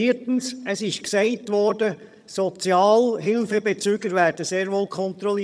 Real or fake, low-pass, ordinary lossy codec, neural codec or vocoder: fake; none; none; vocoder, 22.05 kHz, 80 mel bands, HiFi-GAN